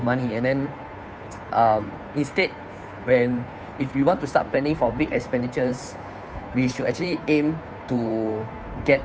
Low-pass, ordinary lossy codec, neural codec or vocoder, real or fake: none; none; codec, 16 kHz, 2 kbps, FunCodec, trained on Chinese and English, 25 frames a second; fake